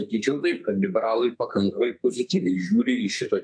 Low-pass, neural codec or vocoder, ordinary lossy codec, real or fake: 9.9 kHz; codec, 32 kHz, 1.9 kbps, SNAC; AAC, 64 kbps; fake